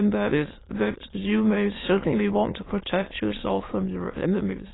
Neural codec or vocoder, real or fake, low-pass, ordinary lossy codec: autoencoder, 22.05 kHz, a latent of 192 numbers a frame, VITS, trained on many speakers; fake; 7.2 kHz; AAC, 16 kbps